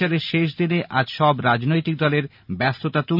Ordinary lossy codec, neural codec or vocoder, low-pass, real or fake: none; none; 5.4 kHz; real